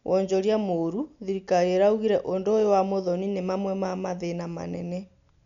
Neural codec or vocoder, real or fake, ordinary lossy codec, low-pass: none; real; MP3, 96 kbps; 7.2 kHz